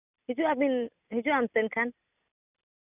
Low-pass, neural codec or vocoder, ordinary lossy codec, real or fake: 3.6 kHz; none; none; real